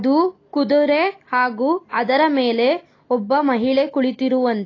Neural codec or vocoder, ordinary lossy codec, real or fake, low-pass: none; AAC, 32 kbps; real; 7.2 kHz